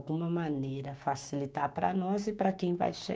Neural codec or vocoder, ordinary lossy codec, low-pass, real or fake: codec, 16 kHz, 6 kbps, DAC; none; none; fake